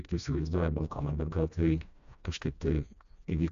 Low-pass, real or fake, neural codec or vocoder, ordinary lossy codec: 7.2 kHz; fake; codec, 16 kHz, 1 kbps, FreqCodec, smaller model; AAC, 96 kbps